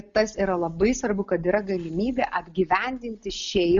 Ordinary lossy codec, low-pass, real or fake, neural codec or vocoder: Opus, 64 kbps; 7.2 kHz; real; none